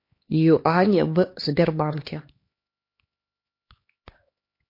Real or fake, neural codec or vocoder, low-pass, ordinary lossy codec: fake; codec, 16 kHz, 2 kbps, X-Codec, HuBERT features, trained on LibriSpeech; 5.4 kHz; MP3, 32 kbps